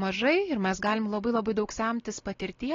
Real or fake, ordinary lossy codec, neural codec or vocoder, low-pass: real; AAC, 32 kbps; none; 7.2 kHz